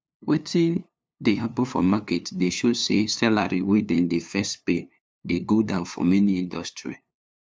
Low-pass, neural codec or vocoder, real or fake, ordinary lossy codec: none; codec, 16 kHz, 2 kbps, FunCodec, trained on LibriTTS, 25 frames a second; fake; none